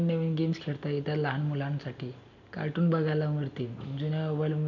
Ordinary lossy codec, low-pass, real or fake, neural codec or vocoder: none; 7.2 kHz; real; none